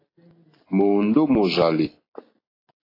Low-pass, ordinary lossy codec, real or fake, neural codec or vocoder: 5.4 kHz; AAC, 24 kbps; real; none